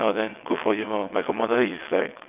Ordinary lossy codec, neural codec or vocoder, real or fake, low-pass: none; vocoder, 22.05 kHz, 80 mel bands, WaveNeXt; fake; 3.6 kHz